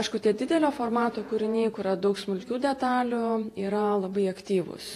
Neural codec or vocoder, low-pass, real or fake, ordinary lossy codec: vocoder, 48 kHz, 128 mel bands, Vocos; 14.4 kHz; fake; AAC, 48 kbps